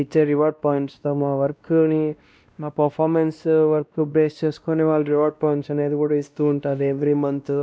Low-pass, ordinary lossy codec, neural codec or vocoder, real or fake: none; none; codec, 16 kHz, 1 kbps, X-Codec, WavLM features, trained on Multilingual LibriSpeech; fake